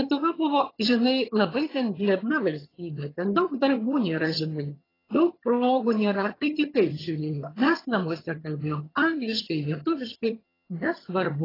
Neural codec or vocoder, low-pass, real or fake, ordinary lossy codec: vocoder, 22.05 kHz, 80 mel bands, HiFi-GAN; 5.4 kHz; fake; AAC, 24 kbps